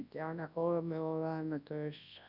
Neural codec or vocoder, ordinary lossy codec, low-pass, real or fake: codec, 24 kHz, 0.9 kbps, WavTokenizer, large speech release; none; 5.4 kHz; fake